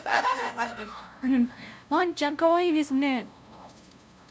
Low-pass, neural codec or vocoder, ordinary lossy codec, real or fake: none; codec, 16 kHz, 0.5 kbps, FunCodec, trained on LibriTTS, 25 frames a second; none; fake